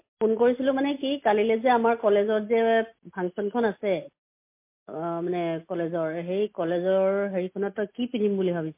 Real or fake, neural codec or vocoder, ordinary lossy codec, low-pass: real; none; MP3, 24 kbps; 3.6 kHz